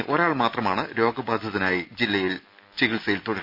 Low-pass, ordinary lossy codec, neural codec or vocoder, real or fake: 5.4 kHz; none; none; real